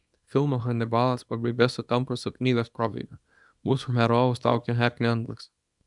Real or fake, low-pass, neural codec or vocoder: fake; 10.8 kHz; codec, 24 kHz, 0.9 kbps, WavTokenizer, small release